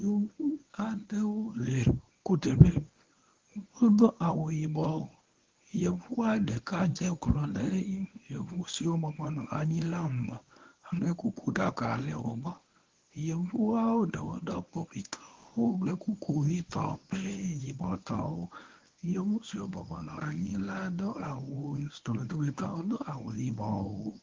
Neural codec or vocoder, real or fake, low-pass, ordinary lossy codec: codec, 24 kHz, 0.9 kbps, WavTokenizer, medium speech release version 1; fake; 7.2 kHz; Opus, 16 kbps